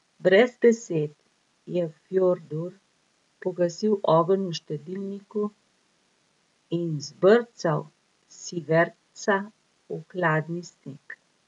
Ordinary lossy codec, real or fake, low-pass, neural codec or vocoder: none; real; 10.8 kHz; none